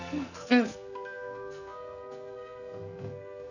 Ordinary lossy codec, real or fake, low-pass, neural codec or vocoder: none; fake; 7.2 kHz; codec, 44.1 kHz, 2.6 kbps, SNAC